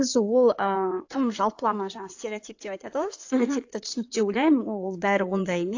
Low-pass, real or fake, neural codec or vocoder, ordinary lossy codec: 7.2 kHz; fake; codec, 16 kHz in and 24 kHz out, 2.2 kbps, FireRedTTS-2 codec; AAC, 48 kbps